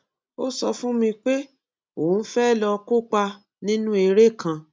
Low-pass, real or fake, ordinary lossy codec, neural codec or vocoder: none; real; none; none